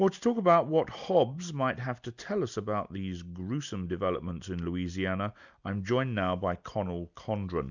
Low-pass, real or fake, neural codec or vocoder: 7.2 kHz; real; none